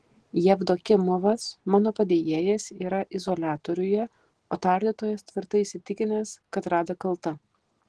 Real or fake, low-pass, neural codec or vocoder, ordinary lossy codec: real; 10.8 kHz; none; Opus, 16 kbps